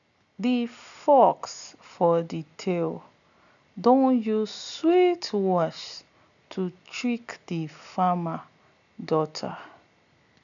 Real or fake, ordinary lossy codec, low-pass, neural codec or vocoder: real; none; 7.2 kHz; none